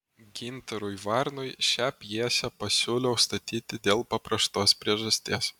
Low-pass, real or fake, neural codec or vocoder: 19.8 kHz; real; none